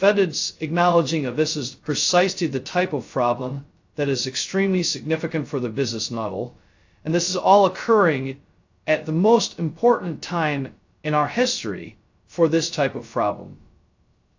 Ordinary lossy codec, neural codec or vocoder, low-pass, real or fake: AAC, 48 kbps; codec, 16 kHz, 0.2 kbps, FocalCodec; 7.2 kHz; fake